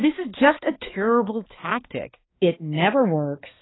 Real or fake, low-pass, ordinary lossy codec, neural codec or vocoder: fake; 7.2 kHz; AAC, 16 kbps; codec, 16 kHz, 2 kbps, X-Codec, HuBERT features, trained on balanced general audio